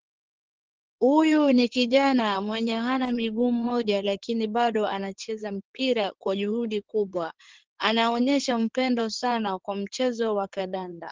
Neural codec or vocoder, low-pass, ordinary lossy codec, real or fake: codec, 16 kHz in and 24 kHz out, 2.2 kbps, FireRedTTS-2 codec; 7.2 kHz; Opus, 16 kbps; fake